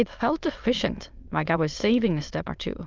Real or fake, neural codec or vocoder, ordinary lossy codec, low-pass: fake; autoencoder, 22.05 kHz, a latent of 192 numbers a frame, VITS, trained on many speakers; Opus, 24 kbps; 7.2 kHz